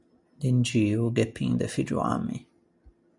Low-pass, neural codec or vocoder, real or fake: 10.8 kHz; none; real